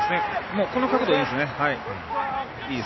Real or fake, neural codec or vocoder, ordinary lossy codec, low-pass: real; none; MP3, 24 kbps; 7.2 kHz